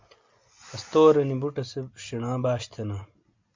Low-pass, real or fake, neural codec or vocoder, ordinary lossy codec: 7.2 kHz; real; none; MP3, 64 kbps